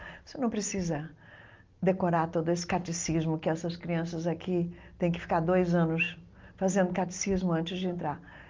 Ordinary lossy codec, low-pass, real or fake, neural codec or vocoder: Opus, 24 kbps; 7.2 kHz; real; none